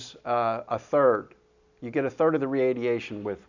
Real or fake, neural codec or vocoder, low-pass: real; none; 7.2 kHz